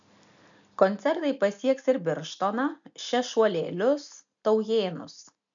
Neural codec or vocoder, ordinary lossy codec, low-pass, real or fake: none; MP3, 96 kbps; 7.2 kHz; real